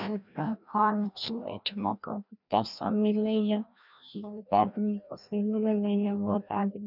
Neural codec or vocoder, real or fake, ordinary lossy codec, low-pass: codec, 16 kHz, 1 kbps, FreqCodec, larger model; fake; none; 5.4 kHz